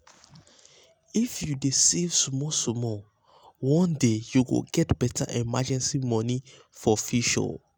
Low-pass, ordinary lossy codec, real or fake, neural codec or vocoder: none; none; real; none